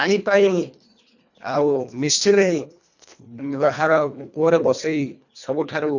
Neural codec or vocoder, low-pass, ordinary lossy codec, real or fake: codec, 24 kHz, 1.5 kbps, HILCodec; 7.2 kHz; none; fake